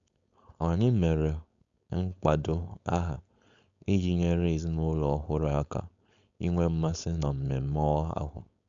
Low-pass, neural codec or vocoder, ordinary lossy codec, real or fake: 7.2 kHz; codec, 16 kHz, 4.8 kbps, FACodec; MP3, 64 kbps; fake